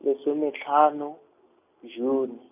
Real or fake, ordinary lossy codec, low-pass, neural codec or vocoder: real; none; 3.6 kHz; none